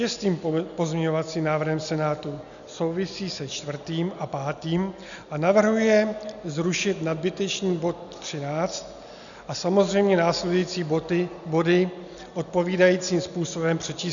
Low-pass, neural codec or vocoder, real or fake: 7.2 kHz; none; real